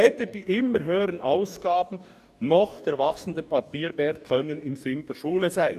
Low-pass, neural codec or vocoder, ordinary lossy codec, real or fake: 14.4 kHz; codec, 44.1 kHz, 2.6 kbps, DAC; none; fake